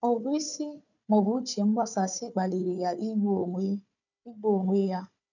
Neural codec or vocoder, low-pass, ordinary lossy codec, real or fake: codec, 16 kHz, 4 kbps, FunCodec, trained on Chinese and English, 50 frames a second; 7.2 kHz; none; fake